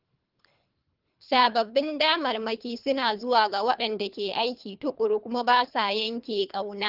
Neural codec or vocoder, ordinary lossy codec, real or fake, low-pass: codec, 24 kHz, 3 kbps, HILCodec; Opus, 32 kbps; fake; 5.4 kHz